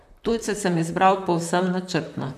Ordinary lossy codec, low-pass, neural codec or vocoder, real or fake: none; 14.4 kHz; vocoder, 44.1 kHz, 128 mel bands, Pupu-Vocoder; fake